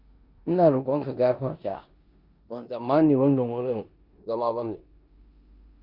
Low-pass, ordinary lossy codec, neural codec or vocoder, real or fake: 5.4 kHz; Opus, 64 kbps; codec, 16 kHz in and 24 kHz out, 0.9 kbps, LongCat-Audio-Codec, four codebook decoder; fake